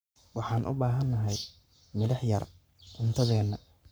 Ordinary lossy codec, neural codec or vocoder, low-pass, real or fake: none; codec, 44.1 kHz, 7.8 kbps, Pupu-Codec; none; fake